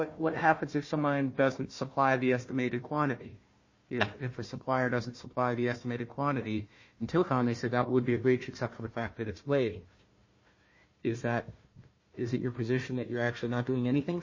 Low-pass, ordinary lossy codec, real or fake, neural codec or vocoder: 7.2 kHz; MP3, 32 kbps; fake; codec, 16 kHz, 1 kbps, FunCodec, trained on Chinese and English, 50 frames a second